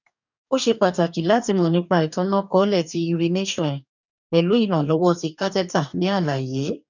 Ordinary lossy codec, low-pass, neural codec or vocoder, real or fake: none; 7.2 kHz; codec, 44.1 kHz, 2.6 kbps, DAC; fake